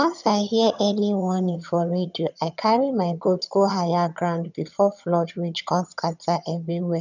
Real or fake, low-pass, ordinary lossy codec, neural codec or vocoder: fake; 7.2 kHz; none; vocoder, 22.05 kHz, 80 mel bands, HiFi-GAN